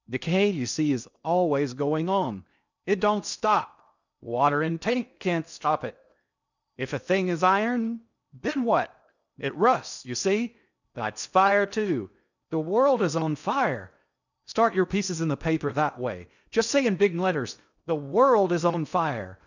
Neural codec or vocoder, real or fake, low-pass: codec, 16 kHz in and 24 kHz out, 0.6 kbps, FocalCodec, streaming, 2048 codes; fake; 7.2 kHz